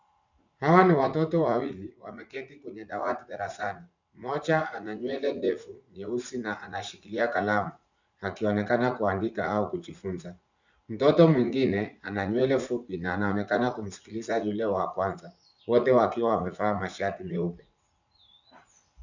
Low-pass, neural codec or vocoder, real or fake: 7.2 kHz; vocoder, 44.1 kHz, 80 mel bands, Vocos; fake